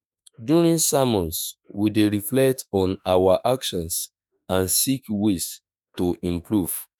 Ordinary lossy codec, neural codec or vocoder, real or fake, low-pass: none; autoencoder, 48 kHz, 32 numbers a frame, DAC-VAE, trained on Japanese speech; fake; none